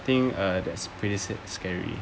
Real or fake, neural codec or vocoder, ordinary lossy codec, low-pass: real; none; none; none